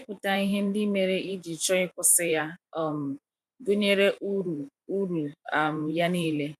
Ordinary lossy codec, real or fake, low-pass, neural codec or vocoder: none; fake; 14.4 kHz; vocoder, 44.1 kHz, 128 mel bands every 512 samples, BigVGAN v2